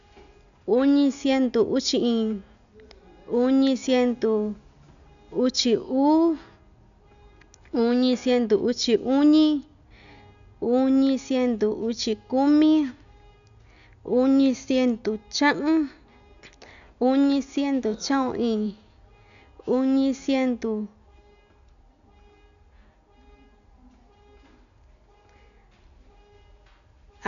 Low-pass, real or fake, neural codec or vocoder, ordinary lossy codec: 7.2 kHz; real; none; none